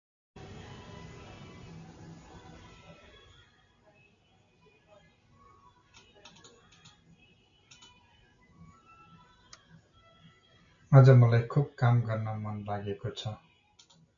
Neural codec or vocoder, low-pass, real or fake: none; 7.2 kHz; real